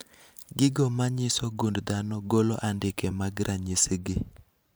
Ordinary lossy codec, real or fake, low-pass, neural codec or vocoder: none; fake; none; vocoder, 44.1 kHz, 128 mel bands every 512 samples, BigVGAN v2